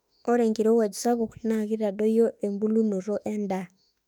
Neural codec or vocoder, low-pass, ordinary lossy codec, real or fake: autoencoder, 48 kHz, 32 numbers a frame, DAC-VAE, trained on Japanese speech; 19.8 kHz; none; fake